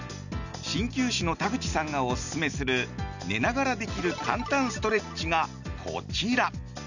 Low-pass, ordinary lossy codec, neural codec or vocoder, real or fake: 7.2 kHz; none; none; real